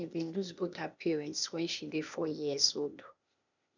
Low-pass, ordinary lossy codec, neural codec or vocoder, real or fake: 7.2 kHz; none; codec, 16 kHz, 0.8 kbps, ZipCodec; fake